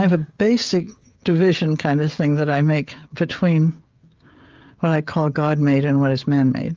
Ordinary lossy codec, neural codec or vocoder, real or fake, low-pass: Opus, 32 kbps; codec, 16 kHz, 16 kbps, FreqCodec, smaller model; fake; 7.2 kHz